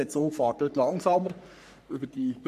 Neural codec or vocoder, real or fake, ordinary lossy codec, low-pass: codec, 44.1 kHz, 3.4 kbps, Pupu-Codec; fake; MP3, 96 kbps; 14.4 kHz